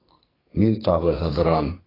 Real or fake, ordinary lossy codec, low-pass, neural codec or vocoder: fake; AAC, 24 kbps; 5.4 kHz; codec, 44.1 kHz, 2.6 kbps, SNAC